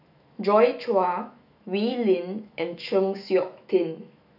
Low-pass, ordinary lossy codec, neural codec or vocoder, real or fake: 5.4 kHz; none; autoencoder, 48 kHz, 128 numbers a frame, DAC-VAE, trained on Japanese speech; fake